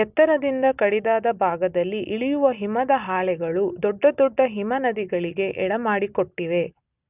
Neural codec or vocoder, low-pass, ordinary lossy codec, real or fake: none; 3.6 kHz; none; real